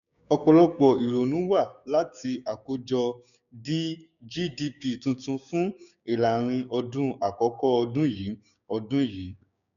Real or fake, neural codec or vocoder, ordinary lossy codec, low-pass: fake; codec, 16 kHz, 6 kbps, DAC; none; 7.2 kHz